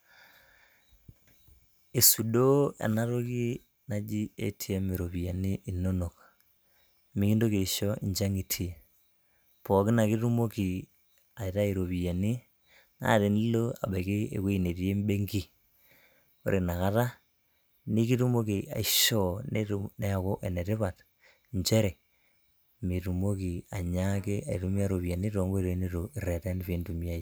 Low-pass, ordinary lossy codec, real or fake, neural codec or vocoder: none; none; real; none